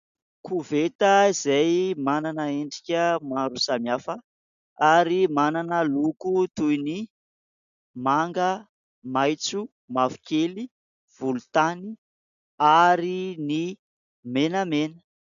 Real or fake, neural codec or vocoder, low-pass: real; none; 7.2 kHz